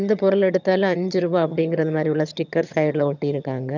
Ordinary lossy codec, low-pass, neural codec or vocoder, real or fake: none; 7.2 kHz; vocoder, 22.05 kHz, 80 mel bands, HiFi-GAN; fake